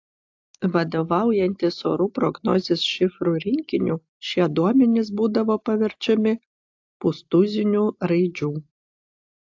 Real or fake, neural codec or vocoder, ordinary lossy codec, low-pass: real; none; AAC, 48 kbps; 7.2 kHz